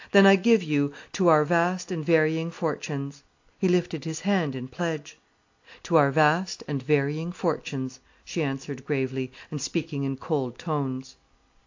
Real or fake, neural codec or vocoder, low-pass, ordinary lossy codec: real; none; 7.2 kHz; AAC, 48 kbps